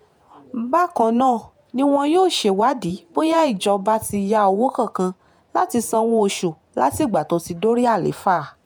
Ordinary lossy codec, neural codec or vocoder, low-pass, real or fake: none; vocoder, 48 kHz, 128 mel bands, Vocos; none; fake